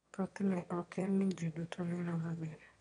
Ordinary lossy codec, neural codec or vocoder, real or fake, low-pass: none; autoencoder, 22.05 kHz, a latent of 192 numbers a frame, VITS, trained on one speaker; fake; 9.9 kHz